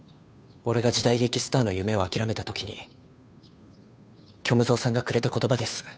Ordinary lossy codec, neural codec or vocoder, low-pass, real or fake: none; codec, 16 kHz, 2 kbps, FunCodec, trained on Chinese and English, 25 frames a second; none; fake